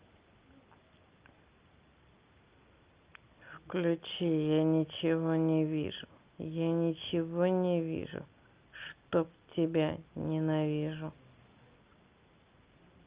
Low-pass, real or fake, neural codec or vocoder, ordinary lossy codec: 3.6 kHz; real; none; Opus, 24 kbps